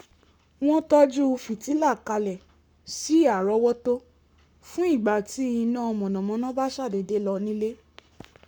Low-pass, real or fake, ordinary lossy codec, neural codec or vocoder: 19.8 kHz; fake; none; codec, 44.1 kHz, 7.8 kbps, Pupu-Codec